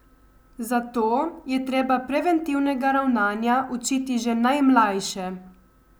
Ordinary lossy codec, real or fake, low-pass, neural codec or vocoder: none; real; none; none